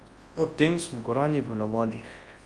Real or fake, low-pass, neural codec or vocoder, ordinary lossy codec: fake; 10.8 kHz; codec, 24 kHz, 0.9 kbps, WavTokenizer, large speech release; Opus, 32 kbps